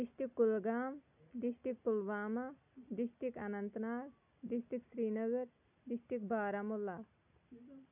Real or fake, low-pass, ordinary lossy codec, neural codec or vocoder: real; 3.6 kHz; none; none